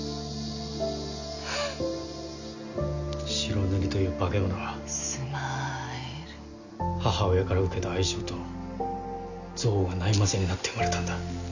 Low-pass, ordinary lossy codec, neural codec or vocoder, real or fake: 7.2 kHz; none; none; real